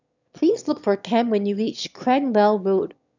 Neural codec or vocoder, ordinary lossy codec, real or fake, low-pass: autoencoder, 22.05 kHz, a latent of 192 numbers a frame, VITS, trained on one speaker; none; fake; 7.2 kHz